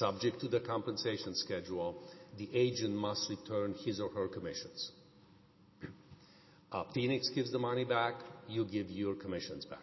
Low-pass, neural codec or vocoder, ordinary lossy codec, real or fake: 7.2 kHz; none; MP3, 24 kbps; real